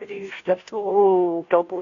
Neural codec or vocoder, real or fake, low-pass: codec, 16 kHz, 0.5 kbps, FunCodec, trained on Chinese and English, 25 frames a second; fake; 7.2 kHz